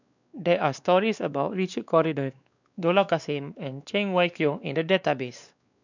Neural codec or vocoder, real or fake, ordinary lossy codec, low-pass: codec, 16 kHz, 2 kbps, X-Codec, WavLM features, trained on Multilingual LibriSpeech; fake; none; 7.2 kHz